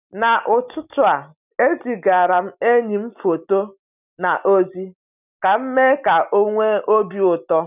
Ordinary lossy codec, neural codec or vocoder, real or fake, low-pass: none; none; real; 3.6 kHz